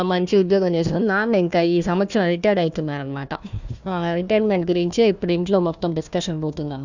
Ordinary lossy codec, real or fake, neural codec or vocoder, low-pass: none; fake; codec, 16 kHz, 1 kbps, FunCodec, trained on Chinese and English, 50 frames a second; 7.2 kHz